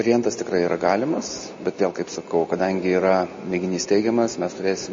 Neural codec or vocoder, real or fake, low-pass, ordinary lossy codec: none; real; 7.2 kHz; MP3, 32 kbps